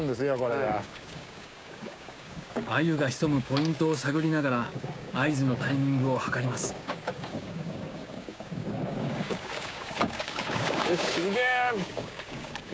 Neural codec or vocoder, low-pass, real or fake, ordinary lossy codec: codec, 16 kHz, 6 kbps, DAC; none; fake; none